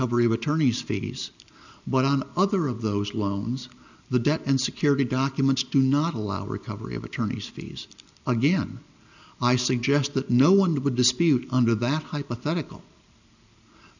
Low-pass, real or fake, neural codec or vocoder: 7.2 kHz; real; none